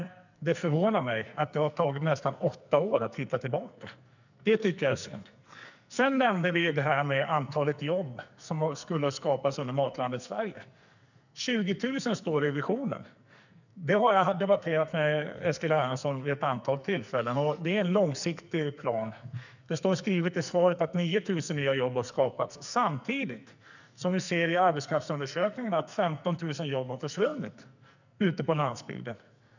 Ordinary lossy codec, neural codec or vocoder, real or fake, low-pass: none; codec, 44.1 kHz, 2.6 kbps, SNAC; fake; 7.2 kHz